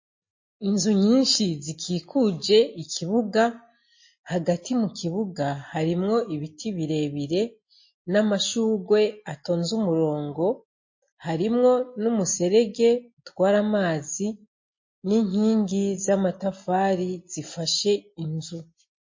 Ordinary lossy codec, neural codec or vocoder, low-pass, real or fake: MP3, 32 kbps; none; 7.2 kHz; real